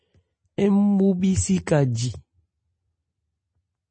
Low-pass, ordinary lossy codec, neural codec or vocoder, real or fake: 9.9 kHz; MP3, 32 kbps; none; real